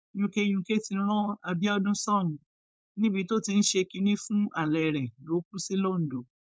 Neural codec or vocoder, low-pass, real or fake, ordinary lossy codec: codec, 16 kHz, 4.8 kbps, FACodec; none; fake; none